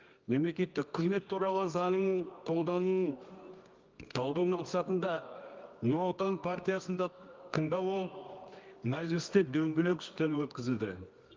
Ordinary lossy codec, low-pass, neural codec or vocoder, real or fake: Opus, 24 kbps; 7.2 kHz; codec, 24 kHz, 0.9 kbps, WavTokenizer, medium music audio release; fake